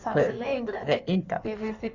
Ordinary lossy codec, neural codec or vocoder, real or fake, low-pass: none; codec, 16 kHz in and 24 kHz out, 1.1 kbps, FireRedTTS-2 codec; fake; 7.2 kHz